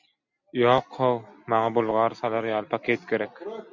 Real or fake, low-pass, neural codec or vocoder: real; 7.2 kHz; none